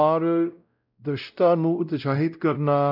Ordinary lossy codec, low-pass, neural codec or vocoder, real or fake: none; 5.4 kHz; codec, 16 kHz, 0.5 kbps, X-Codec, WavLM features, trained on Multilingual LibriSpeech; fake